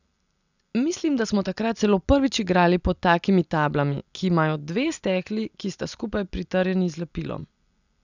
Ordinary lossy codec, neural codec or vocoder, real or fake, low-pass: none; none; real; 7.2 kHz